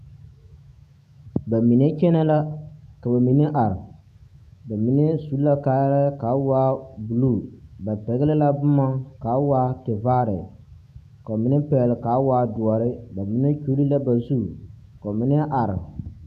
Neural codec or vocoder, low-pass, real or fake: autoencoder, 48 kHz, 128 numbers a frame, DAC-VAE, trained on Japanese speech; 14.4 kHz; fake